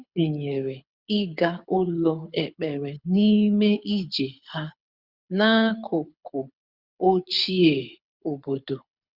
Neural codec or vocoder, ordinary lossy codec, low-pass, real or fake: codec, 24 kHz, 6 kbps, HILCodec; Opus, 64 kbps; 5.4 kHz; fake